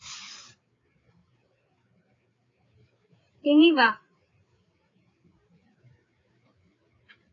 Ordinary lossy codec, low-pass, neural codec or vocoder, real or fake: AAC, 32 kbps; 7.2 kHz; codec, 16 kHz, 4 kbps, FreqCodec, larger model; fake